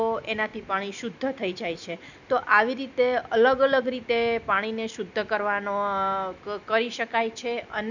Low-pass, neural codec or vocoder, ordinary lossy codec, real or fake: 7.2 kHz; none; none; real